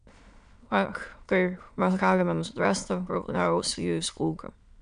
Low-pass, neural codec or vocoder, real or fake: 9.9 kHz; autoencoder, 22.05 kHz, a latent of 192 numbers a frame, VITS, trained on many speakers; fake